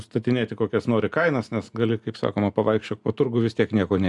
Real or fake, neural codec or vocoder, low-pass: real; none; 10.8 kHz